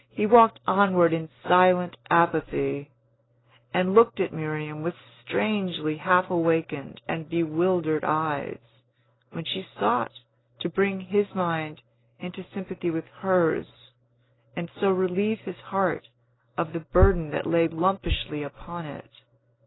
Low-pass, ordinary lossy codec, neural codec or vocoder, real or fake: 7.2 kHz; AAC, 16 kbps; none; real